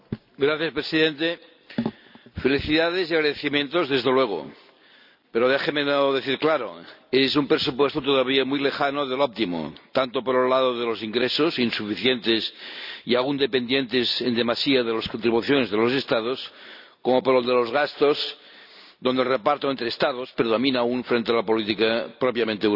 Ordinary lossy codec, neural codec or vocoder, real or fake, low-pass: none; none; real; 5.4 kHz